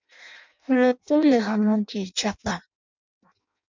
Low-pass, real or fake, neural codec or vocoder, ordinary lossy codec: 7.2 kHz; fake; codec, 16 kHz in and 24 kHz out, 0.6 kbps, FireRedTTS-2 codec; MP3, 64 kbps